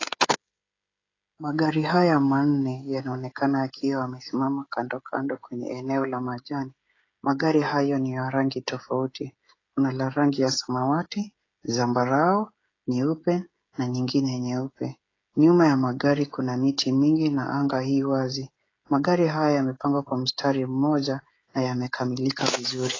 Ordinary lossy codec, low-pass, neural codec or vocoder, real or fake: AAC, 32 kbps; 7.2 kHz; codec, 16 kHz, 16 kbps, FreqCodec, smaller model; fake